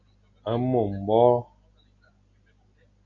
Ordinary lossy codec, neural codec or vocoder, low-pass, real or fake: MP3, 64 kbps; none; 7.2 kHz; real